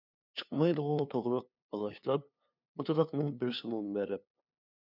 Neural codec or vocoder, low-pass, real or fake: codec, 16 kHz, 2 kbps, FunCodec, trained on LibriTTS, 25 frames a second; 5.4 kHz; fake